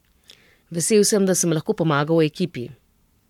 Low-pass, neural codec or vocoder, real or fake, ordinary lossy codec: 19.8 kHz; codec, 44.1 kHz, 7.8 kbps, Pupu-Codec; fake; MP3, 96 kbps